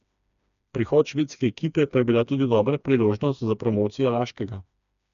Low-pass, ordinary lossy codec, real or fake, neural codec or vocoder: 7.2 kHz; none; fake; codec, 16 kHz, 2 kbps, FreqCodec, smaller model